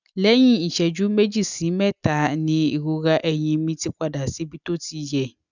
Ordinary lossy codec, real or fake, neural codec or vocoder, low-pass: none; real; none; 7.2 kHz